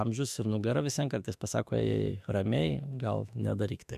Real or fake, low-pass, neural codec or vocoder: fake; 14.4 kHz; autoencoder, 48 kHz, 32 numbers a frame, DAC-VAE, trained on Japanese speech